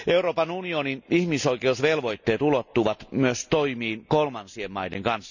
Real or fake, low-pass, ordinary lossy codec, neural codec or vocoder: real; 7.2 kHz; none; none